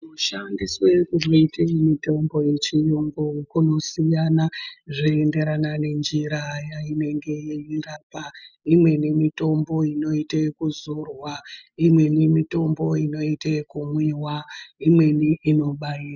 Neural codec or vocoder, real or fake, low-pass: none; real; 7.2 kHz